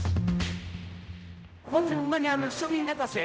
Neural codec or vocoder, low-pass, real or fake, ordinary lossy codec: codec, 16 kHz, 0.5 kbps, X-Codec, HuBERT features, trained on general audio; none; fake; none